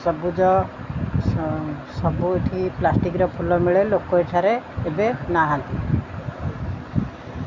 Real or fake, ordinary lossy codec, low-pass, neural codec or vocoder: real; MP3, 64 kbps; 7.2 kHz; none